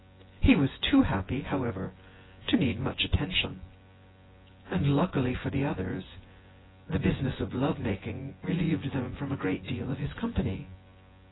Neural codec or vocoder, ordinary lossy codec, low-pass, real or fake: vocoder, 24 kHz, 100 mel bands, Vocos; AAC, 16 kbps; 7.2 kHz; fake